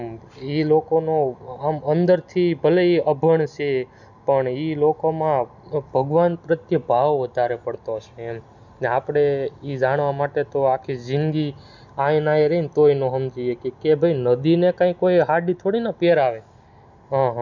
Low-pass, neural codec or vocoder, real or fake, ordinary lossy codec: 7.2 kHz; none; real; none